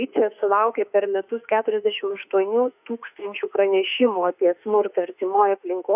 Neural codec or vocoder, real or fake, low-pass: autoencoder, 48 kHz, 32 numbers a frame, DAC-VAE, trained on Japanese speech; fake; 3.6 kHz